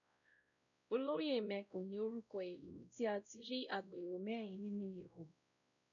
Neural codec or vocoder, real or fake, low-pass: codec, 16 kHz, 0.5 kbps, X-Codec, WavLM features, trained on Multilingual LibriSpeech; fake; 7.2 kHz